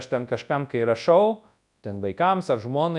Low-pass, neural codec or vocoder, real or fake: 10.8 kHz; codec, 24 kHz, 0.9 kbps, WavTokenizer, large speech release; fake